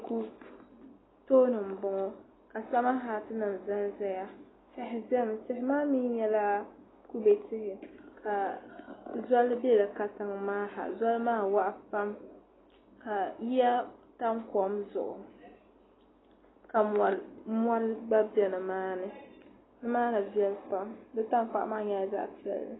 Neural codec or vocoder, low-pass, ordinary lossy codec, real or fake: none; 7.2 kHz; AAC, 16 kbps; real